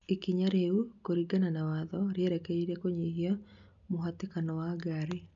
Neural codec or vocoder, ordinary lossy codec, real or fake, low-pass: none; none; real; 7.2 kHz